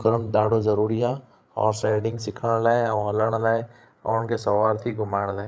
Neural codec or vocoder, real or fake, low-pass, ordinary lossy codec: codec, 16 kHz, 8 kbps, FreqCodec, larger model; fake; none; none